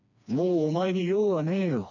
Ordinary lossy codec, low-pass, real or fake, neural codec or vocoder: none; 7.2 kHz; fake; codec, 16 kHz, 2 kbps, FreqCodec, smaller model